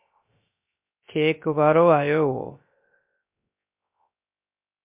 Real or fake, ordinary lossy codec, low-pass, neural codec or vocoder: fake; MP3, 32 kbps; 3.6 kHz; codec, 16 kHz, 0.3 kbps, FocalCodec